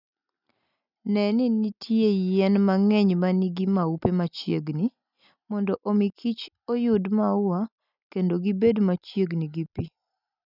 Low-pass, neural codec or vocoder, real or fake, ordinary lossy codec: 5.4 kHz; none; real; none